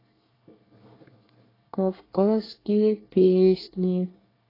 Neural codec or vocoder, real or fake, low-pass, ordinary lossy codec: codec, 24 kHz, 1 kbps, SNAC; fake; 5.4 kHz; AAC, 24 kbps